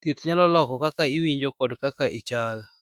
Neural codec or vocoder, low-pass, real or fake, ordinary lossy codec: autoencoder, 48 kHz, 32 numbers a frame, DAC-VAE, trained on Japanese speech; 19.8 kHz; fake; Opus, 64 kbps